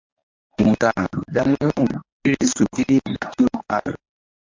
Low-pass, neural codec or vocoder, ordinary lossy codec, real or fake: 7.2 kHz; codec, 24 kHz, 0.9 kbps, WavTokenizer, medium speech release version 1; MP3, 48 kbps; fake